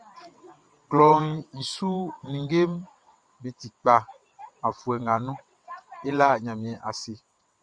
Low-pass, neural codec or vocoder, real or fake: 9.9 kHz; vocoder, 22.05 kHz, 80 mel bands, WaveNeXt; fake